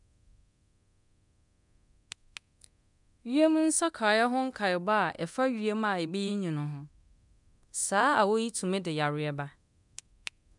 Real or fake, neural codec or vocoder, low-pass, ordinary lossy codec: fake; codec, 24 kHz, 0.9 kbps, DualCodec; 10.8 kHz; MP3, 96 kbps